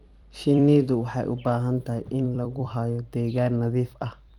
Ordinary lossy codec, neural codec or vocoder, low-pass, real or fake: Opus, 32 kbps; vocoder, 44.1 kHz, 128 mel bands every 256 samples, BigVGAN v2; 19.8 kHz; fake